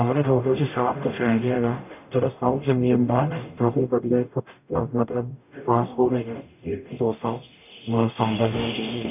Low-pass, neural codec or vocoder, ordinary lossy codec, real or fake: 3.6 kHz; codec, 44.1 kHz, 0.9 kbps, DAC; none; fake